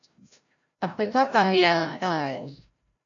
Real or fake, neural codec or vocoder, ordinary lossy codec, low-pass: fake; codec, 16 kHz, 0.5 kbps, FreqCodec, larger model; MP3, 96 kbps; 7.2 kHz